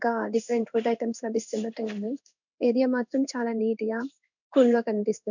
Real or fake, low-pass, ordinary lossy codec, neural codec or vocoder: fake; 7.2 kHz; none; codec, 16 kHz in and 24 kHz out, 1 kbps, XY-Tokenizer